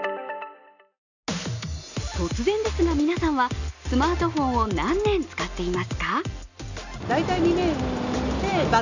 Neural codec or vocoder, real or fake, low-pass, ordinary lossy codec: none; real; 7.2 kHz; none